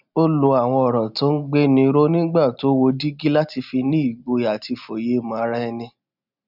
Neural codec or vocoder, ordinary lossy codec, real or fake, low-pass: none; none; real; 5.4 kHz